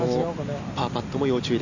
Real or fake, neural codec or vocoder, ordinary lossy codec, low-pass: real; none; none; 7.2 kHz